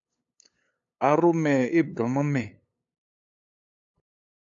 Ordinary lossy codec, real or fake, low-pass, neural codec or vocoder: AAC, 64 kbps; fake; 7.2 kHz; codec, 16 kHz, 8 kbps, FunCodec, trained on LibriTTS, 25 frames a second